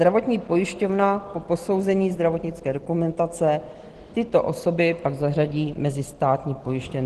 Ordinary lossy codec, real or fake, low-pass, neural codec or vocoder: Opus, 16 kbps; real; 10.8 kHz; none